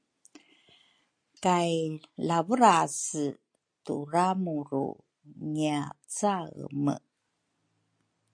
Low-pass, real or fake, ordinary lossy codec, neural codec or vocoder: 9.9 kHz; real; MP3, 48 kbps; none